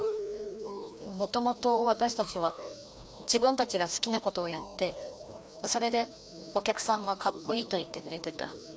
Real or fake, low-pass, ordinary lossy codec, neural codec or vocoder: fake; none; none; codec, 16 kHz, 1 kbps, FreqCodec, larger model